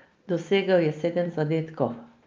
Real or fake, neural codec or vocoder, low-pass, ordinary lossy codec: real; none; 7.2 kHz; Opus, 24 kbps